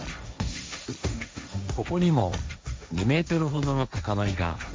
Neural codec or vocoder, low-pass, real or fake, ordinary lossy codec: codec, 16 kHz, 1.1 kbps, Voila-Tokenizer; none; fake; none